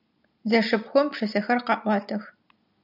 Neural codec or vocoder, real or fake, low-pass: none; real; 5.4 kHz